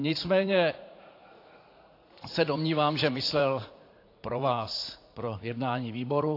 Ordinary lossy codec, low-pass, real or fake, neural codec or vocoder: AAC, 32 kbps; 5.4 kHz; fake; vocoder, 44.1 kHz, 80 mel bands, Vocos